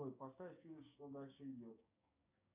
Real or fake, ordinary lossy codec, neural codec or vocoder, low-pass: fake; AAC, 32 kbps; codec, 16 kHz, 6 kbps, DAC; 3.6 kHz